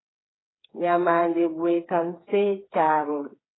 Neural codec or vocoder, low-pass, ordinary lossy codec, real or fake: codec, 16 kHz, 4 kbps, FreqCodec, smaller model; 7.2 kHz; AAC, 16 kbps; fake